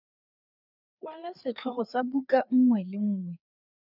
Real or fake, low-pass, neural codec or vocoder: fake; 5.4 kHz; codec, 16 kHz, 4 kbps, FreqCodec, larger model